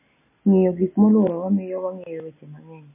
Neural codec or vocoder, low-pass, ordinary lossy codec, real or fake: none; 3.6 kHz; MP3, 16 kbps; real